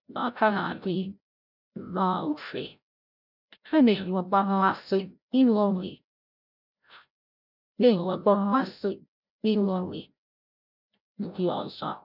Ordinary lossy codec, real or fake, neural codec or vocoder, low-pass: none; fake; codec, 16 kHz, 0.5 kbps, FreqCodec, larger model; 5.4 kHz